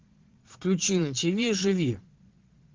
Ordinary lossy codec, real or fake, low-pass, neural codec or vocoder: Opus, 16 kbps; fake; 7.2 kHz; vocoder, 22.05 kHz, 80 mel bands, Vocos